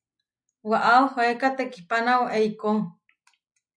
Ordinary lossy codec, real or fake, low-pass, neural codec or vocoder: MP3, 96 kbps; real; 9.9 kHz; none